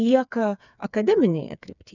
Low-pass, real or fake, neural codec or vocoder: 7.2 kHz; fake; codec, 16 kHz, 4 kbps, FreqCodec, smaller model